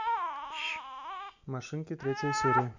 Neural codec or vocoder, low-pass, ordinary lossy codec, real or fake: none; 7.2 kHz; none; real